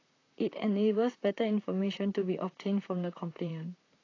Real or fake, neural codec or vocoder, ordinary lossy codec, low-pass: fake; vocoder, 44.1 kHz, 128 mel bands, Pupu-Vocoder; AAC, 32 kbps; 7.2 kHz